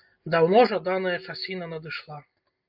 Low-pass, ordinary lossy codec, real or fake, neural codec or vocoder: 5.4 kHz; MP3, 48 kbps; real; none